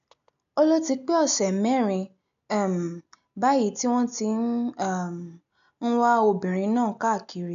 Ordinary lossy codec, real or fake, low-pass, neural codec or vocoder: none; real; 7.2 kHz; none